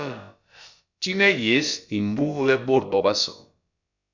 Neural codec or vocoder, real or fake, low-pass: codec, 16 kHz, about 1 kbps, DyCAST, with the encoder's durations; fake; 7.2 kHz